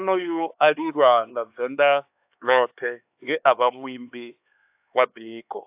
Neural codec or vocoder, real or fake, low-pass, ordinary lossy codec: codec, 16 kHz, 2 kbps, X-Codec, HuBERT features, trained on LibriSpeech; fake; 3.6 kHz; AAC, 32 kbps